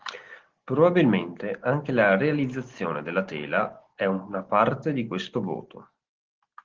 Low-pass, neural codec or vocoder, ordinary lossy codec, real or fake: 7.2 kHz; none; Opus, 16 kbps; real